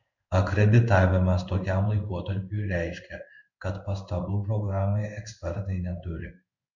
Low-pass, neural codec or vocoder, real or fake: 7.2 kHz; codec, 16 kHz in and 24 kHz out, 1 kbps, XY-Tokenizer; fake